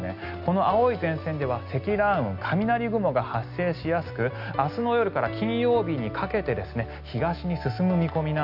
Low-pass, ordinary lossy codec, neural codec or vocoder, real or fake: 5.4 kHz; none; none; real